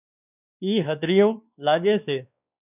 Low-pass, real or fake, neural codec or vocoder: 3.6 kHz; fake; codec, 16 kHz, 2 kbps, X-Codec, WavLM features, trained on Multilingual LibriSpeech